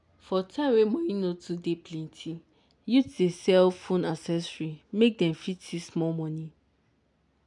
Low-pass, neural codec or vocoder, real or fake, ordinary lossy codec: 10.8 kHz; none; real; MP3, 96 kbps